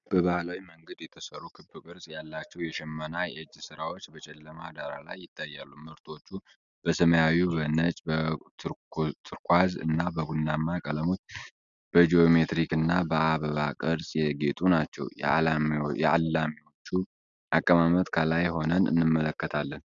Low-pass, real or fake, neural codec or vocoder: 7.2 kHz; real; none